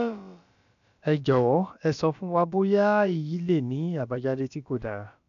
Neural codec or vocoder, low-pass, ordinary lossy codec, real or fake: codec, 16 kHz, about 1 kbps, DyCAST, with the encoder's durations; 7.2 kHz; none; fake